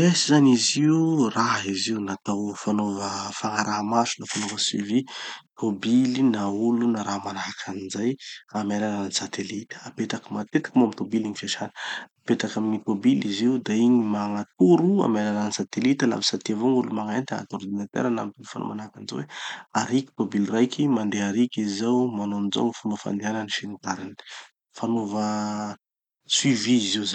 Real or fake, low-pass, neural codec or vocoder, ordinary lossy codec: real; 19.8 kHz; none; none